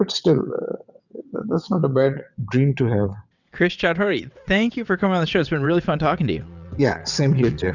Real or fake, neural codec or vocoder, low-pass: fake; vocoder, 22.05 kHz, 80 mel bands, WaveNeXt; 7.2 kHz